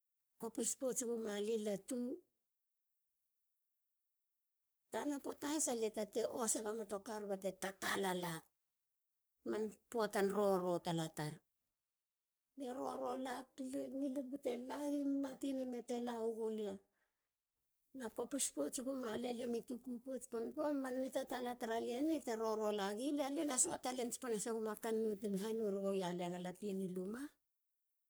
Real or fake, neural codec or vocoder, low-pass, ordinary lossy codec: fake; codec, 44.1 kHz, 3.4 kbps, Pupu-Codec; none; none